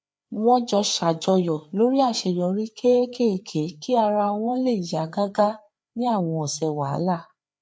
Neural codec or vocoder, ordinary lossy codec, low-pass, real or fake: codec, 16 kHz, 4 kbps, FreqCodec, larger model; none; none; fake